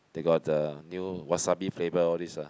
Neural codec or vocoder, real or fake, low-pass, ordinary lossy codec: none; real; none; none